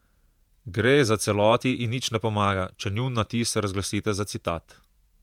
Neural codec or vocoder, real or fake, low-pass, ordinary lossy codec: vocoder, 48 kHz, 128 mel bands, Vocos; fake; 19.8 kHz; MP3, 96 kbps